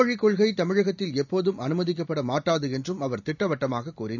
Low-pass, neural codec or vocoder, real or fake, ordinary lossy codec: 7.2 kHz; none; real; none